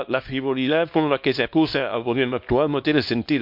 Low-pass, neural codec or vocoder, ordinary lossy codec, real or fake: 5.4 kHz; codec, 24 kHz, 0.9 kbps, WavTokenizer, small release; MP3, 48 kbps; fake